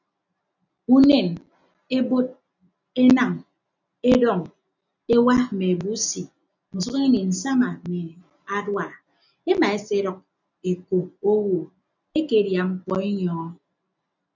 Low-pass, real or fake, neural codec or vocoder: 7.2 kHz; real; none